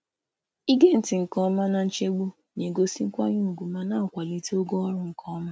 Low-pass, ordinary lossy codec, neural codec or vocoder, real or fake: none; none; none; real